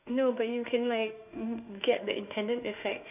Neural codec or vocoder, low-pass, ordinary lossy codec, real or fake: autoencoder, 48 kHz, 32 numbers a frame, DAC-VAE, trained on Japanese speech; 3.6 kHz; none; fake